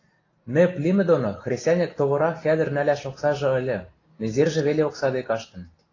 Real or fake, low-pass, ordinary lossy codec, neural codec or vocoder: real; 7.2 kHz; AAC, 32 kbps; none